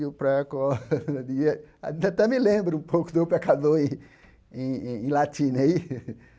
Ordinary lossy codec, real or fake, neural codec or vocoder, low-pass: none; real; none; none